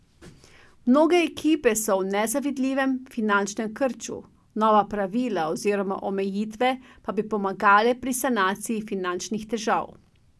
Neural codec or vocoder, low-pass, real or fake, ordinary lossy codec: none; none; real; none